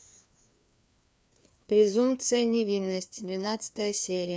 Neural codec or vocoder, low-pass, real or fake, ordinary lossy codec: codec, 16 kHz, 2 kbps, FreqCodec, larger model; none; fake; none